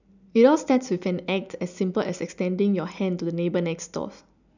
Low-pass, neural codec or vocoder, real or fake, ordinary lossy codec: 7.2 kHz; none; real; none